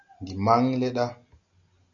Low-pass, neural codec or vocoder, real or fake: 7.2 kHz; none; real